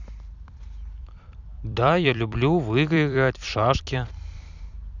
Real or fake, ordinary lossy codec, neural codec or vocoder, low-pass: fake; none; vocoder, 44.1 kHz, 128 mel bands every 256 samples, BigVGAN v2; 7.2 kHz